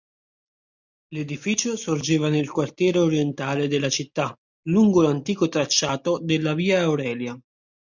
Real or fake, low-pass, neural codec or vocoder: real; 7.2 kHz; none